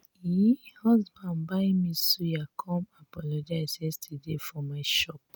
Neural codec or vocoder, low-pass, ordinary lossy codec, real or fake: none; none; none; real